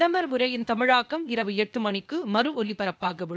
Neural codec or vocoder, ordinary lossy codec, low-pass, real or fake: codec, 16 kHz, 0.8 kbps, ZipCodec; none; none; fake